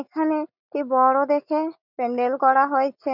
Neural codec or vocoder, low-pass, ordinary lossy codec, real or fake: none; 5.4 kHz; none; real